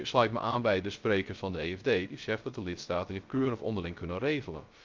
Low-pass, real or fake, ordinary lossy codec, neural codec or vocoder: 7.2 kHz; fake; Opus, 24 kbps; codec, 16 kHz, 0.3 kbps, FocalCodec